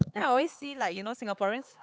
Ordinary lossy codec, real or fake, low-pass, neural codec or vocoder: none; fake; none; codec, 16 kHz, 4 kbps, X-Codec, HuBERT features, trained on LibriSpeech